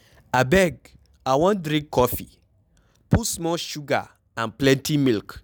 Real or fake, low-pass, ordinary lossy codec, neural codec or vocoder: real; none; none; none